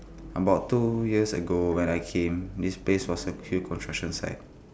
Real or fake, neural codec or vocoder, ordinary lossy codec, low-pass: real; none; none; none